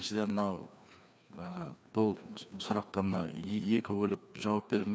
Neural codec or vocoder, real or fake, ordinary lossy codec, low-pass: codec, 16 kHz, 2 kbps, FreqCodec, larger model; fake; none; none